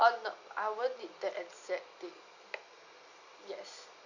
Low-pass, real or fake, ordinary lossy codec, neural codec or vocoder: 7.2 kHz; real; none; none